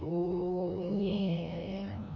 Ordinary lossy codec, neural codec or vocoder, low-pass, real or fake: MP3, 64 kbps; codec, 16 kHz, 1 kbps, FreqCodec, larger model; 7.2 kHz; fake